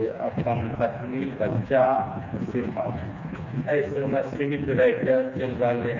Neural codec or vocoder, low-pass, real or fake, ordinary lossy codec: codec, 16 kHz, 2 kbps, FreqCodec, smaller model; 7.2 kHz; fake; none